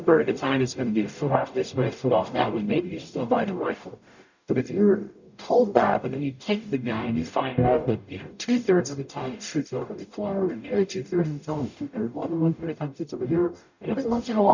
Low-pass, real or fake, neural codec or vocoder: 7.2 kHz; fake; codec, 44.1 kHz, 0.9 kbps, DAC